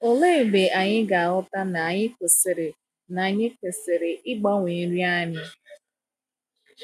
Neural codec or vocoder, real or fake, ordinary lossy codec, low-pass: none; real; none; 14.4 kHz